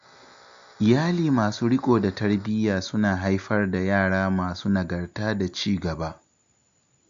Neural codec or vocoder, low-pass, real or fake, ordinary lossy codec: none; 7.2 kHz; real; MP3, 64 kbps